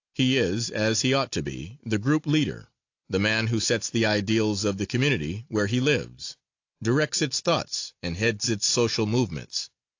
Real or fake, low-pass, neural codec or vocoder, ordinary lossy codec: real; 7.2 kHz; none; AAC, 48 kbps